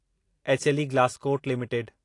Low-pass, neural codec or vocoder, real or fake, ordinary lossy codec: 10.8 kHz; none; real; AAC, 48 kbps